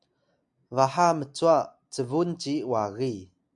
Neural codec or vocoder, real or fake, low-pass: none; real; 10.8 kHz